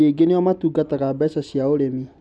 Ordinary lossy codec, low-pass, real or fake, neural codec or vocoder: none; none; real; none